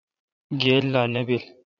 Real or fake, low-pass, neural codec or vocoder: fake; 7.2 kHz; vocoder, 44.1 kHz, 80 mel bands, Vocos